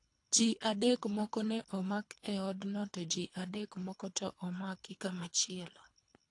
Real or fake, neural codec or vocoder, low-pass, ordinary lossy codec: fake; codec, 24 kHz, 3 kbps, HILCodec; 10.8 kHz; AAC, 48 kbps